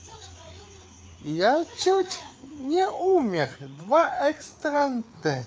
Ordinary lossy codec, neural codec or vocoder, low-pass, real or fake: none; codec, 16 kHz, 4 kbps, FreqCodec, larger model; none; fake